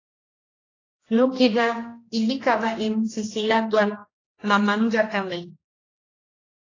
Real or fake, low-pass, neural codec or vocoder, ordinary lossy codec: fake; 7.2 kHz; codec, 16 kHz, 1 kbps, X-Codec, HuBERT features, trained on general audio; AAC, 32 kbps